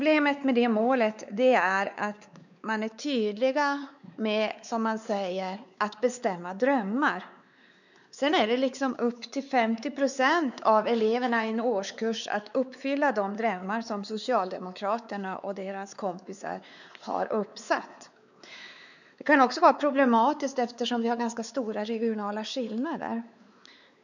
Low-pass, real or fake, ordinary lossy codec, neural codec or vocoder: 7.2 kHz; fake; none; codec, 16 kHz, 4 kbps, X-Codec, WavLM features, trained on Multilingual LibriSpeech